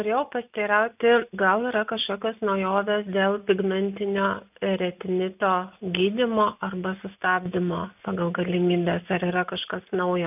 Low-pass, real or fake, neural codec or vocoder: 3.6 kHz; real; none